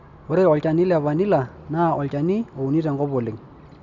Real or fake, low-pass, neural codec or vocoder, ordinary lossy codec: real; 7.2 kHz; none; none